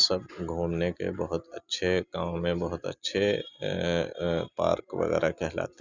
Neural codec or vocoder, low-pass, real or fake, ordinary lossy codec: none; 7.2 kHz; real; Opus, 64 kbps